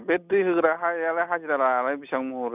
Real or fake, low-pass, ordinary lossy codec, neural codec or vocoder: real; 3.6 kHz; none; none